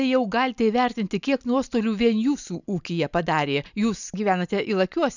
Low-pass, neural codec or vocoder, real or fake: 7.2 kHz; none; real